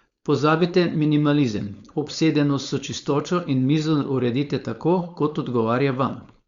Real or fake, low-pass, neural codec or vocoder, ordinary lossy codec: fake; 7.2 kHz; codec, 16 kHz, 4.8 kbps, FACodec; Opus, 64 kbps